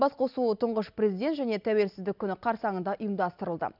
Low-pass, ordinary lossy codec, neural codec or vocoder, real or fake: 5.4 kHz; none; none; real